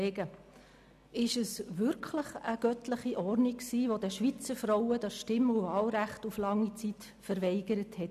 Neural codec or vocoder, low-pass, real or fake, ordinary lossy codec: none; 14.4 kHz; real; none